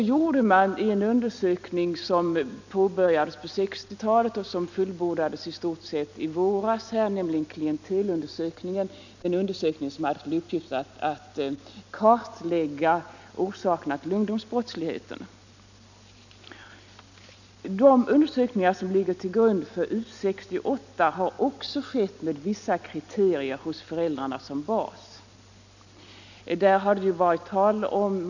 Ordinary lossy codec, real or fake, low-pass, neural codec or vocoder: none; real; 7.2 kHz; none